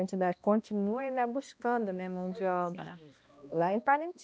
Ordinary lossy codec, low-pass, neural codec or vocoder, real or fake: none; none; codec, 16 kHz, 1 kbps, X-Codec, HuBERT features, trained on balanced general audio; fake